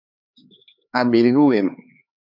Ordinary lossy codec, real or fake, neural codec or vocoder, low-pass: AAC, 48 kbps; fake; codec, 16 kHz, 4 kbps, X-Codec, HuBERT features, trained on LibriSpeech; 5.4 kHz